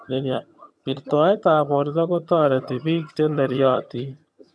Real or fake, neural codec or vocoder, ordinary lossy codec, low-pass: fake; vocoder, 22.05 kHz, 80 mel bands, HiFi-GAN; none; none